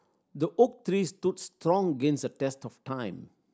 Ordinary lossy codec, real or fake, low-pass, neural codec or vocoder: none; real; none; none